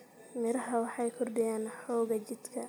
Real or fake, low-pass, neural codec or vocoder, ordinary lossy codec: real; none; none; none